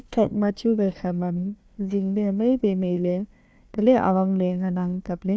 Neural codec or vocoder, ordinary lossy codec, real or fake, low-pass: codec, 16 kHz, 1 kbps, FunCodec, trained on Chinese and English, 50 frames a second; none; fake; none